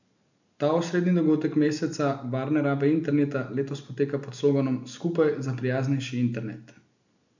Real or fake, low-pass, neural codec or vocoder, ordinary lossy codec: real; 7.2 kHz; none; none